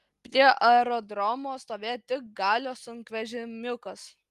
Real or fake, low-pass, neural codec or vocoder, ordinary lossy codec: real; 14.4 kHz; none; Opus, 32 kbps